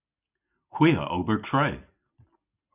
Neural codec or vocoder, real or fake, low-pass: none; real; 3.6 kHz